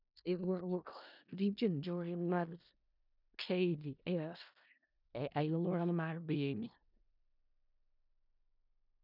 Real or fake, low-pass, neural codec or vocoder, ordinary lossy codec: fake; 5.4 kHz; codec, 16 kHz in and 24 kHz out, 0.4 kbps, LongCat-Audio-Codec, four codebook decoder; none